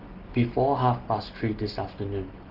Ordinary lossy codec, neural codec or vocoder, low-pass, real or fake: Opus, 16 kbps; none; 5.4 kHz; real